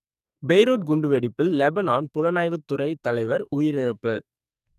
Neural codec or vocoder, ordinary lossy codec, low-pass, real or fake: codec, 44.1 kHz, 2.6 kbps, SNAC; none; 14.4 kHz; fake